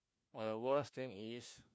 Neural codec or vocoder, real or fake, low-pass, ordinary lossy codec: codec, 16 kHz, 1 kbps, FunCodec, trained on LibriTTS, 50 frames a second; fake; none; none